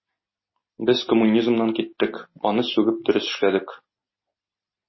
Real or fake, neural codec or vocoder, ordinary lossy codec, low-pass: real; none; MP3, 24 kbps; 7.2 kHz